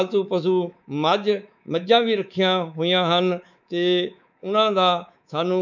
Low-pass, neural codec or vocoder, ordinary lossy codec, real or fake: 7.2 kHz; codec, 24 kHz, 3.1 kbps, DualCodec; none; fake